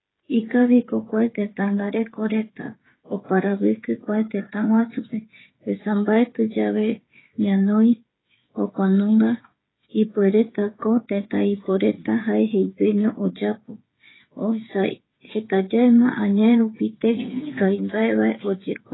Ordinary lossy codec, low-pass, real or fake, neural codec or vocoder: AAC, 16 kbps; 7.2 kHz; fake; codec, 16 kHz, 16 kbps, FreqCodec, smaller model